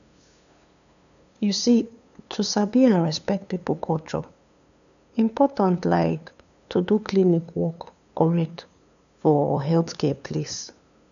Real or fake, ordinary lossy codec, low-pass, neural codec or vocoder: fake; none; 7.2 kHz; codec, 16 kHz, 2 kbps, FunCodec, trained on LibriTTS, 25 frames a second